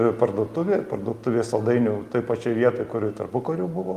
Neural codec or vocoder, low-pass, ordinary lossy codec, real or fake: none; 14.4 kHz; Opus, 32 kbps; real